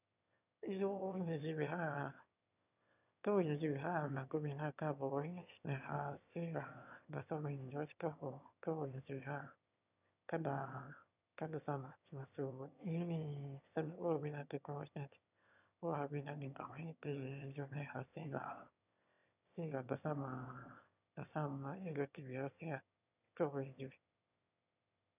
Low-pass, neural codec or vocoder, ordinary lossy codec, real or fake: 3.6 kHz; autoencoder, 22.05 kHz, a latent of 192 numbers a frame, VITS, trained on one speaker; none; fake